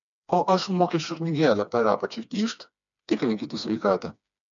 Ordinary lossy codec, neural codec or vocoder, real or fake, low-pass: MP3, 64 kbps; codec, 16 kHz, 2 kbps, FreqCodec, smaller model; fake; 7.2 kHz